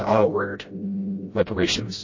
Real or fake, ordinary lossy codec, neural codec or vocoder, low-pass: fake; MP3, 32 kbps; codec, 16 kHz, 0.5 kbps, FreqCodec, smaller model; 7.2 kHz